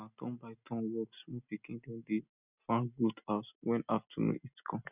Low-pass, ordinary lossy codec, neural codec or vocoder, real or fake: 3.6 kHz; none; none; real